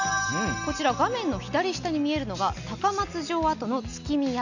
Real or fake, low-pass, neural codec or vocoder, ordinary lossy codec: real; 7.2 kHz; none; none